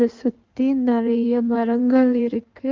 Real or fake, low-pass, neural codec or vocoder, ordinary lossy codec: fake; 7.2 kHz; codec, 16 kHz in and 24 kHz out, 1.1 kbps, FireRedTTS-2 codec; Opus, 32 kbps